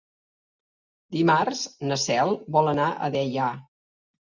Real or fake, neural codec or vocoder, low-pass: real; none; 7.2 kHz